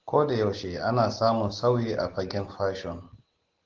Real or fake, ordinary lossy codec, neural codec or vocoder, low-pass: real; Opus, 16 kbps; none; 7.2 kHz